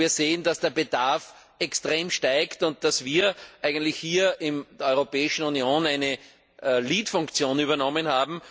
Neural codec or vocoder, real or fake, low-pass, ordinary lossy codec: none; real; none; none